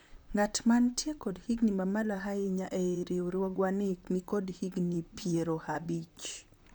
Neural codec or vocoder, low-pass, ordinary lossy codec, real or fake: vocoder, 44.1 kHz, 128 mel bands, Pupu-Vocoder; none; none; fake